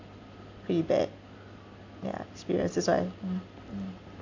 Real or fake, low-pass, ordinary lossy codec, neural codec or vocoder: real; 7.2 kHz; AAC, 48 kbps; none